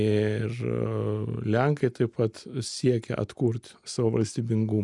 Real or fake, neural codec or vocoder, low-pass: real; none; 10.8 kHz